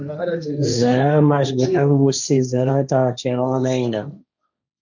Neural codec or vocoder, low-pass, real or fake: codec, 16 kHz, 1.1 kbps, Voila-Tokenizer; 7.2 kHz; fake